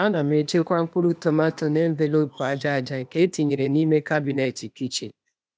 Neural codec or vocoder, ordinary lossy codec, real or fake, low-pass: codec, 16 kHz, 0.8 kbps, ZipCodec; none; fake; none